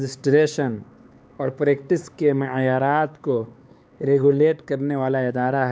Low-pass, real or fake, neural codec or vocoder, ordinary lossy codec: none; fake; codec, 16 kHz, 4 kbps, X-Codec, HuBERT features, trained on LibriSpeech; none